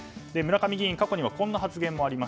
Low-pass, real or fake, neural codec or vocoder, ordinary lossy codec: none; real; none; none